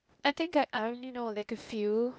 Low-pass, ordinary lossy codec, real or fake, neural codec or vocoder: none; none; fake; codec, 16 kHz, 0.8 kbps, ZipCodec